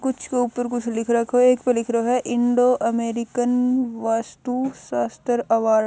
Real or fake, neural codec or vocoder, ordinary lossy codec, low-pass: real; none; none; none